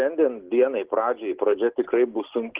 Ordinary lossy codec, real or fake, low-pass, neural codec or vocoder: Opus, 16 kbps; real; 3.6 kHz; none